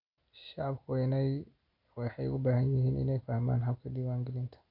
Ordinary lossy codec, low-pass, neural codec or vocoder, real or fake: none; 5.4 kHz; none; real